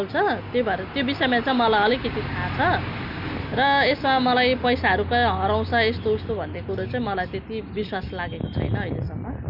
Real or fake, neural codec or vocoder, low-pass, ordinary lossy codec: real; none; 5.4 kHz; none